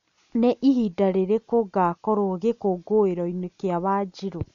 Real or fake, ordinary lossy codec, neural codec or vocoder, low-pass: real; AAC, 96 kbps; none; 7.2 kHz